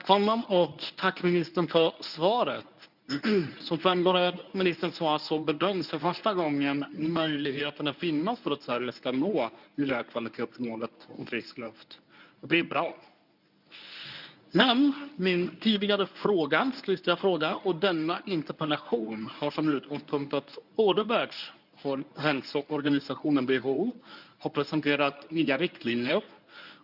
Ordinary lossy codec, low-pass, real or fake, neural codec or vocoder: none; 5.4 kHz; fake; codec, 24 kHz, 0.9 kbps, WavTokenizer, medium speech release version 1